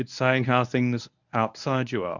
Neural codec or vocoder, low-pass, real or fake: codec, 24 kHz, 0.9 kbps, WavTokenizer, medium speech release version 1; 7.2 kHz; fake